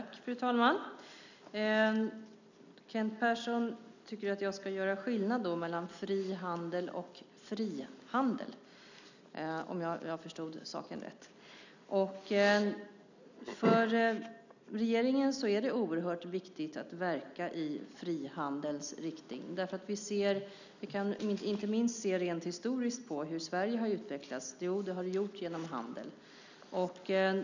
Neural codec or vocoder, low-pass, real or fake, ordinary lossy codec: none; 7.2 kHz; real; none